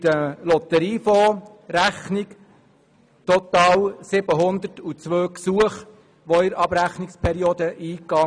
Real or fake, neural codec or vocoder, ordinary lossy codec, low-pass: real; none; none; 9.9 kHz